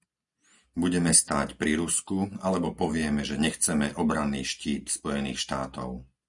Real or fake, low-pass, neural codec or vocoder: real; 10.8 kHz; none